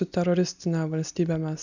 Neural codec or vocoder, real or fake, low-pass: none; real; 7.2 kHz